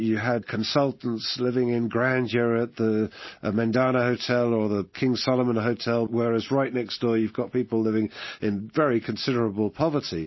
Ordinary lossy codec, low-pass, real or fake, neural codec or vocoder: MP3, 24 kbps; 7.2 kHz; real; none